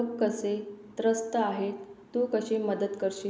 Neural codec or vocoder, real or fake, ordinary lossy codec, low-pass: none; real; none; none